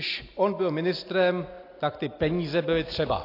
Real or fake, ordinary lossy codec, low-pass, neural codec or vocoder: real; AAC, 32 kbps; 5.4 kHz; none